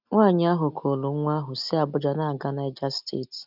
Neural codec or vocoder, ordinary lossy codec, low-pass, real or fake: none; none; 5.4 kHz; real